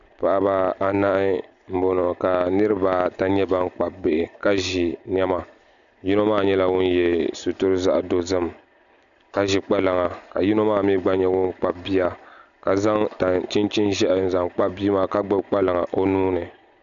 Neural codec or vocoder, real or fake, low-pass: none; real; 7.2 kHz